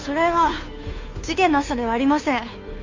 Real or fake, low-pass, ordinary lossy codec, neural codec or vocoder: fake; 7.2 kHz; MP3, 48 kbps; codec, 16 kHz, 2 kbps, FunCodec, trained on Chinese and English, 25 frames a second